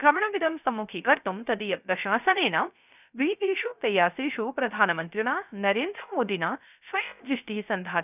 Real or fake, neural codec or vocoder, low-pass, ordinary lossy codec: fake; codec, 16 kHz, 0.3 kbps, FocalCodec; 3.6 kHz; none